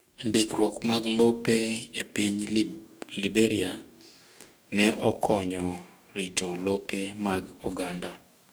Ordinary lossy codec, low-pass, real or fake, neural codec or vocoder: none; none; fake; codec, 44.1 kHz, 2.6 kbps, DAC